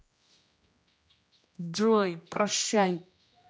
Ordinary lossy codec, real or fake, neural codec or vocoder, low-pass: none; fake; codec, 16 kHz, 1 kbps, X-Codec, HuBERT features, trained on general audio; none